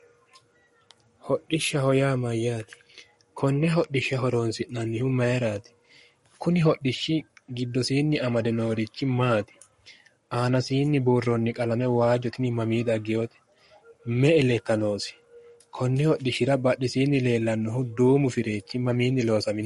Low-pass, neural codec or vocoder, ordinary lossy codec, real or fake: 19.8 kHz; codec, 44.1 kHz, 7.8 kbps, Pupu-Codec; MP3, 48 kbps; fake